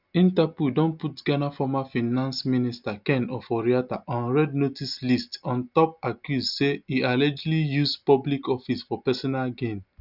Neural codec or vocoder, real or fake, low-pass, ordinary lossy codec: none; real; 5.4 kHz; none